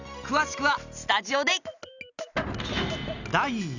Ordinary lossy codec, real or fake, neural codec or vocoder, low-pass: none; real; none; 7.2 kHz